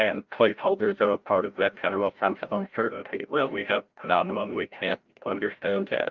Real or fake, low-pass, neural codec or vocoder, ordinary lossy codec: fake; 7.2 kHz; codec, 16 kHz, 0.5 kbps, FreqCodec, larger model; Opus, 24 kbps